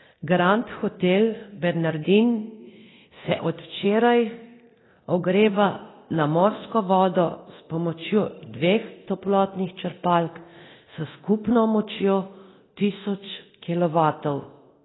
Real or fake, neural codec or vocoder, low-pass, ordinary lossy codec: fake; codec, 24 kHz, 0.9 kbps, DualCodec; 7.2 kHz; AAC, 16 kbps